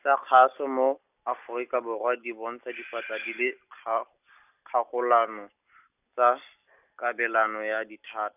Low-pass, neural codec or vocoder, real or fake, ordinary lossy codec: 3.6 kHz; none; real; none